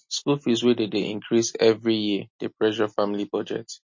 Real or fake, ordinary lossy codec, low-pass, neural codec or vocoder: real; MP3, 32 kbps; 7.2 kHz; none